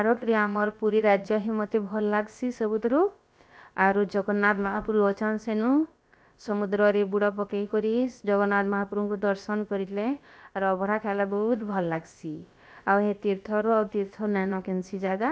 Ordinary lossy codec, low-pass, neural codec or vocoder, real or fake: none; none; codec, 16 kHz, about 1 kbps, DyCAST, with the encoder's durations; fake